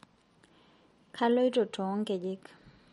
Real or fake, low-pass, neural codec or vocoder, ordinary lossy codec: real; 19.8 kHz; none; MP3, 48 kbps